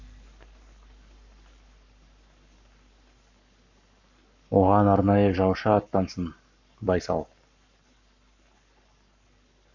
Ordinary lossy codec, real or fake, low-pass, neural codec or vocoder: none; fake; 7.2 kHz; codec, 44.1 kHz, 7.8 kbps, Pupu-Codec